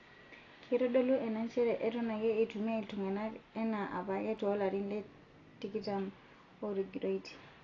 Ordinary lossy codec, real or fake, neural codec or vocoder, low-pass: AAC, 32 kbps; real; none; 7.2 kHz